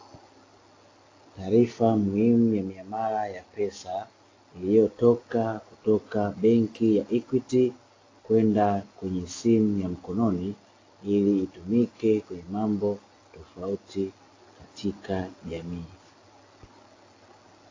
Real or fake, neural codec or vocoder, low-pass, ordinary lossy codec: real; none; 7.2 kHz; AAC, 32 kbps